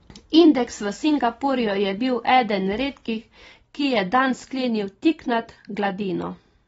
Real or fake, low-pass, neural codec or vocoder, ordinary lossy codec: fake; 19.8 kHz; vocoder, 44.1 kHz, 128 mel bands every 256 samples, BigVGAN v2; AAC, 24 kbps